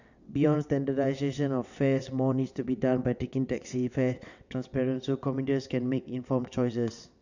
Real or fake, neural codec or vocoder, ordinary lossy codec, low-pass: fake; vocoder, 22.05 kHz, 80 mel bands, WaveNeXt; none; 7.2 kHz